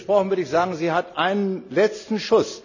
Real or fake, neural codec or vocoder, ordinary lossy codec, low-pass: real; none; none; 7.2 kHz